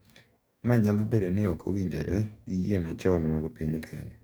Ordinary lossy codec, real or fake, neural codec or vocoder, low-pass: none; fake; codec, 44.1 kHz, 2.6 kbps, DAC; none